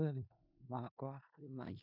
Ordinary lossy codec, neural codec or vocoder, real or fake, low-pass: none; codec, 16 kHz in and 24 kHz out, 0.4 kbps, LongCat-Audio-Codec, four codebook decoder; fake; 5.4 kHz